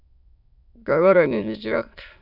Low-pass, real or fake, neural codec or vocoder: 5.4 kHz; fake; autoencoder, 22.05 kHz, a latent of 192 numbers a frame, VITS, trained on many speakers